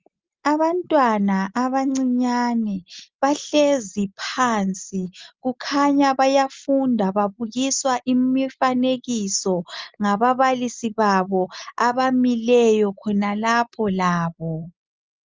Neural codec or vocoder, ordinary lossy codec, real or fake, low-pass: none; Opus, 32 kbps; real; 7.2 kHz